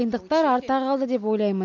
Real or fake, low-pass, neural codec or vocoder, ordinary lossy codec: real; 7.2 kHz; none; none